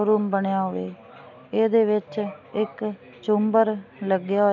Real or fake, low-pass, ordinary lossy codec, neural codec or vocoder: real; 7.2 kHz; none; none